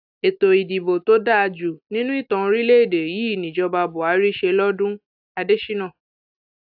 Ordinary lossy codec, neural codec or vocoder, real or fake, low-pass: AAC, 48 kbps; none; real; 5.4 kHz